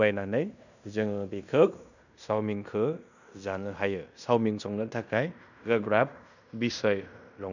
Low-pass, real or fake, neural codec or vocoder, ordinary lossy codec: 7.2 kHz; fake; codec, 16 kHz in and 24 kHz out, 0.9 kbps, LongCat-Audio-Codec, fine tuned four codebook decoder; none